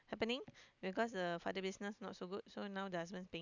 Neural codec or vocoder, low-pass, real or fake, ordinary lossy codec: none; 7.2 kHz; real; none